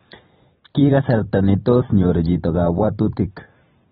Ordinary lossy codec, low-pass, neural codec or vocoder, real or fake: AAC, 16 kbps; 19.8 kHz; vocoder, 44.1 kHz, 128 mel bands every 256 samples, BigVGAN v2; fake